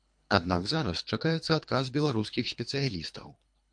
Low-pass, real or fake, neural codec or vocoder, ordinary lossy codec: 9.9 kHz; fake; codec, 24 kHz, 3 kbps, HILCodec; MP3, 64 kbps